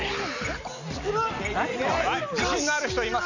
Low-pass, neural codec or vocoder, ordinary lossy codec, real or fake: 7.2 kHz; none; none; real